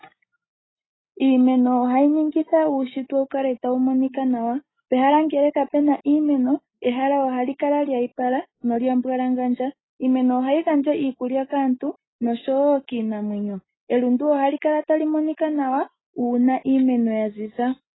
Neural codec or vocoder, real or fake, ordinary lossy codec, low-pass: none; real; AAC, 16 kbps; 7.2 kHz